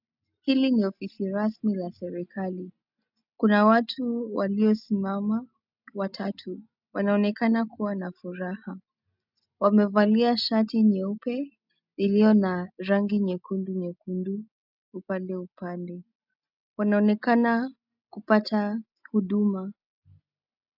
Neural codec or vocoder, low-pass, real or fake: none; 5.4 kHz; real